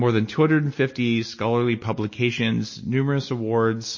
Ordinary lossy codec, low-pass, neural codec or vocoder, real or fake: MP3, 32 kbps; 7.2 kHz; none; real